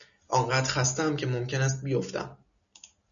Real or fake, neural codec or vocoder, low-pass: real; none; 7.2 kHz